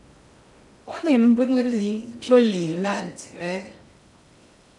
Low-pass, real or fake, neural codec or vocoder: 10.8 kHz; fake; codec, 16 kHz in and 24 kHz out, 0.6 kbps, FocalCodec, streaming, 2048 codes